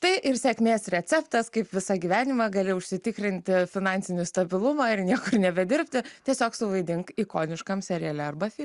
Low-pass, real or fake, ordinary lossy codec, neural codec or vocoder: 10.8 kHz; real; Opus, 64 kbps; none